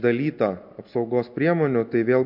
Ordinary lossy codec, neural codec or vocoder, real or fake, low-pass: MP3, 48 kbps; none; real; 5.4 kHz